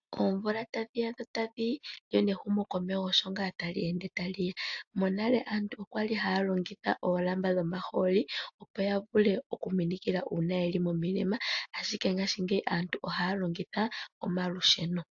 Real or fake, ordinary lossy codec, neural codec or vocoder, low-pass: real; AAC, 64 kbps; none; 7.2 kHz